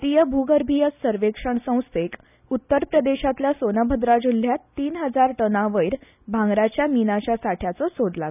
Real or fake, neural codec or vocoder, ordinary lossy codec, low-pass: real; none; none; 3.6 kHz